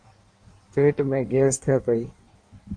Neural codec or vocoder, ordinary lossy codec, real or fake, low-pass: codec, 16 kHz in and 24 kHz out, 1.1 kbps, FireRedTTS-2 codec; MP3, 64 kbps; fake; 9.9 kHz